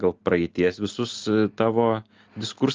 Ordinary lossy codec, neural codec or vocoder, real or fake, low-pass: Opus, 24 kbps; none; real; 7.2 kHz